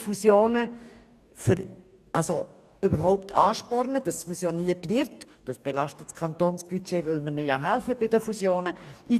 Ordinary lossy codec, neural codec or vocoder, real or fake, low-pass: none; codec, 44.1 kHz, 2.6 kbps, DAC; fake; 14.4 kHz